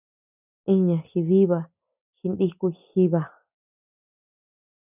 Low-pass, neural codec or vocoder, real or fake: 3.6 kHz; none; real